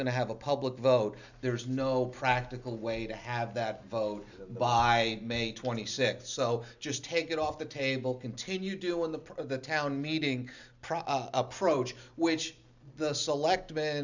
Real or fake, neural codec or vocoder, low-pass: real; none; 7.2 kHz